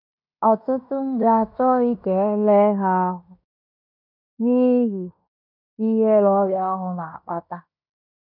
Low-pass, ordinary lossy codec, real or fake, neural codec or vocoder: 5.4 kHz; none; fake; codec, 16 kHz in and 24 kHz out, 0.9 kbps, LongCat-Audio-Codec, fine tuned four codebook decoder